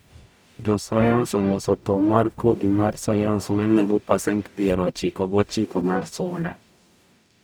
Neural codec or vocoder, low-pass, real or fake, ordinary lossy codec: codec, 44.1 kHz, 0.9 kbps, DAC; none; fake; none